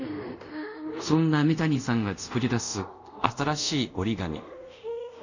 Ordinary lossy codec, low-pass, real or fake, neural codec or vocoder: none; 7.2 kHz; fake; codec, 24 kHz, 0.5 kbps, DualCodec